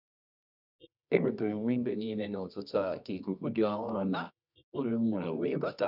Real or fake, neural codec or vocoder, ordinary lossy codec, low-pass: fake; codec, 24 kHz, 0.9 kbps, WavTokenizer, medium music audio release; none; 5.4 kHz